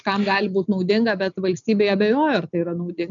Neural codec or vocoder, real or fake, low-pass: none; real; 7.2 kHz